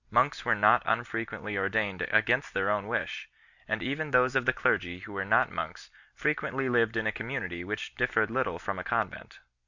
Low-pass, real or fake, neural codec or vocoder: 7.2 kHz; real; none